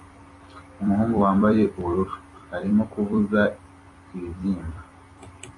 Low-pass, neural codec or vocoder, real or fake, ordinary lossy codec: 10.8 kHz; vocoder, 44.1 kHz, 128 mel bands every 256 samples, BigVGAN v2; fake; AAC, 32 kbps